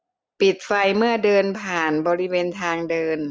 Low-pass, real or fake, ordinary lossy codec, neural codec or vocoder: none; real; none; none